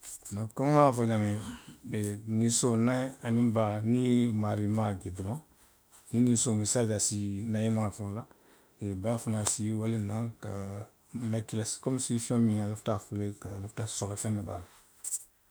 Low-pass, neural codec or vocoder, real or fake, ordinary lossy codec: none; autoencoder, 48 kHz, 32 numbers a frame, DAC-VAE, trained on Japanese speech; fake; none